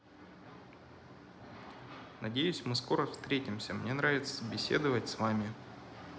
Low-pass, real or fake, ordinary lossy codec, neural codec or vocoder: none; real; none; none